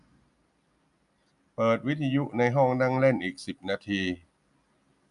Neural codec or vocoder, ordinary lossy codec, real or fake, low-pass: none; none; real; 10.8 kHz